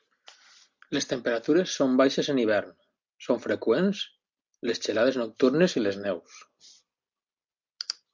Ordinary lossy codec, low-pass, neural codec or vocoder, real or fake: MP3, 64 kbps; 7.2 kHz; none; real